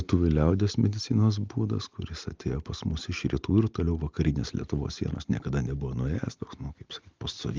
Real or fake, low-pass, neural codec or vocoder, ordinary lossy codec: real; 7.2 kHz; none; Opus, 32 kbps